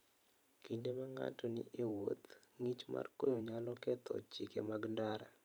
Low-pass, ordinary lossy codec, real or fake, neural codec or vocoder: none; none; fake; vocoder, 44.1 kHz, 128 mel bands every 512 samples, BigVGAN v2